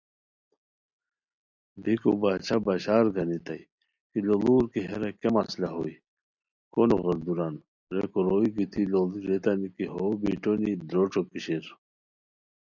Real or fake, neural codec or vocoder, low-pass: real; none; 7.2 kHz